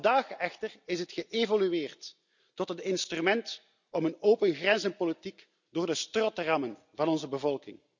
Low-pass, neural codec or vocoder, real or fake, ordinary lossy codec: 7.2 kHz; none; real; none